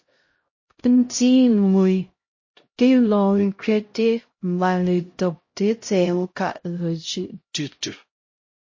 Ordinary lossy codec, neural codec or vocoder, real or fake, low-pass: MP3, 32 kbps; codec, 16 kHz, 0.5 kbps, X-Codec, HuBERT features, trained on LibriSpeech; fake; 7.2 kHz